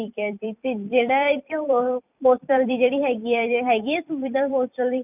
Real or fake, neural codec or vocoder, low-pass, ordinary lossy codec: fake; vocoder, 44.1 kHz, 128 mel bands every 256 samples, BigVGAN v2; 3.6 kHz; none